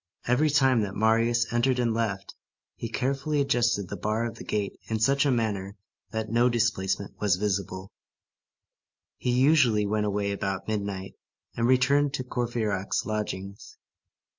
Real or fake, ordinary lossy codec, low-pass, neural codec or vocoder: real; MP3, 48 kbps; 7.2 kHz; none